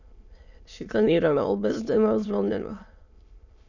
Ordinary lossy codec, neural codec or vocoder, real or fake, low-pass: none; autoencoder, 22.05 kHz, a latent of 192 numbers a frame, VITS, trained on many speakers; fake; 7.2 kHz